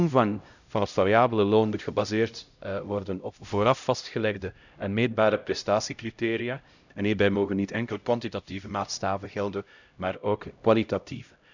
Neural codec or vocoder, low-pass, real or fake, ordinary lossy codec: codec, 16 kHz, 0.5 kbps, X-Codec, HuBERT features, trained on LibriSpeech; 7.2 kHz; fake; none